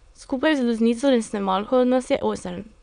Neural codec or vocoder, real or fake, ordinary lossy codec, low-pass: autoencoder, 22.05 kHz, a latent of 192 numbers a frame, VITS, trained on many speakers; fake; none; 9.9 kHz